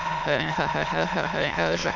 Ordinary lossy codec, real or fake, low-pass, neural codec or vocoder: none; fake; 7.2 kHz; autoencoder, 22.05 kHz, a latent of 192 numbers a frame, VITS, trained on many speakers